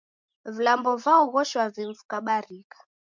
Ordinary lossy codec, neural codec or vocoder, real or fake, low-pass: MP3, 64 kbps; none; real; 7.2 kHz